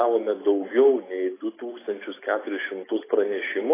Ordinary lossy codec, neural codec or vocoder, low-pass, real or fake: AAC, 16 kbps; none; 3.6 kHz; real